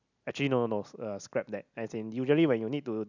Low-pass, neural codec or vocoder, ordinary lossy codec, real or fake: 7.2 kHz; none; none; real